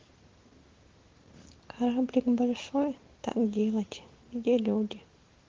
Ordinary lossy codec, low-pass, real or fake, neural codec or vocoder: Opus, 16 kbps; 7.2 kHz; real; none